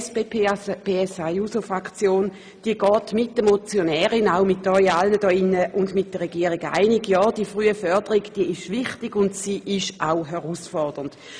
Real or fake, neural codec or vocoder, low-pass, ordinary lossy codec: real; none; 9.9 kHz; none